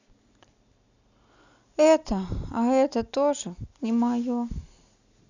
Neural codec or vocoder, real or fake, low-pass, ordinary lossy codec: none; real; 7.2 kHz; none